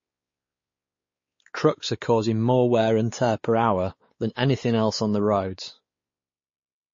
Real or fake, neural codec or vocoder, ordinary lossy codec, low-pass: fake; codec, 16 kHz, 4 kbps, X-Codec, WavLM features, trained on Multilingual LibriSpeech; MP3, 32 kbps; 7.2 kHz